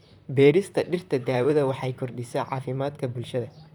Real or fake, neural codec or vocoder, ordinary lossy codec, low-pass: fake; vocoder, 44.1 kHz, 128 mel bands, Pupu-Vocoder; none; 19.8 kHz